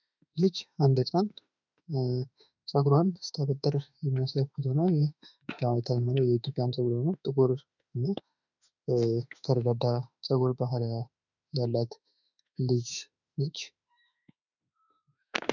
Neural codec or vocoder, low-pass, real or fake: autoencoder, 48 kHz, 32 numbers a frame, DAC-VAE, trained on Japanese speech; 7.2 kHz; fake